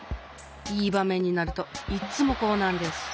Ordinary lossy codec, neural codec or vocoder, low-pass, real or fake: none; none; none; real